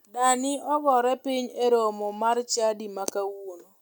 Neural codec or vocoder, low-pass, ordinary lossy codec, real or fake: none; none; none; real